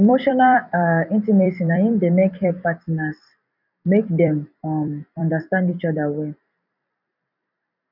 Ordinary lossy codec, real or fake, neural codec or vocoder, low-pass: none; fake; vocoder, 44.1 kHz, 128 mel bands every 256 samples, BigVGAN v2; 5.4 kHz